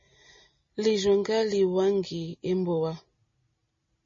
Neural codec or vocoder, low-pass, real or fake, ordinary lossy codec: none; 7.2 kHz; real; MP3, 32 kbps